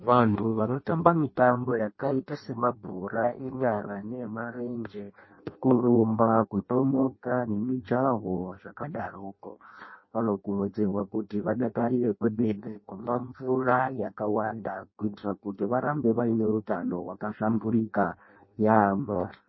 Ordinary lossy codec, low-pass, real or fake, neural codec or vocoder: MP3, 24 kbps; 7.2 kHz; fake; codec, 16 kHz in and 24 kHz out, 0.6 kbps, FireRedTTS-2 codec